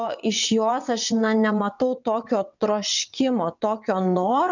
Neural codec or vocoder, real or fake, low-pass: vocoder, 22.05 kHz, 80 mel bands, WaveNeXt; fake; 7.2 kHz